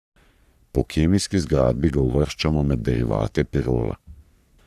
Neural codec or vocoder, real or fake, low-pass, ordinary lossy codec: codec, 44.1 kHz, 3.4 kbps, Pupu-Codec; fake; 14.4 kHz; none